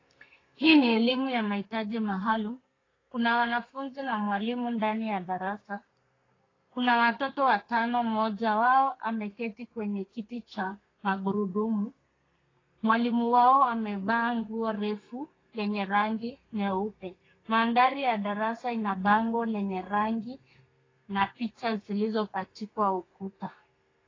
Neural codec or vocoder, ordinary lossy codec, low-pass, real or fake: codec, 32 kHz, 1.9 kbps, SNAC; AAC, 32 kbps; 7.2 kHz; fake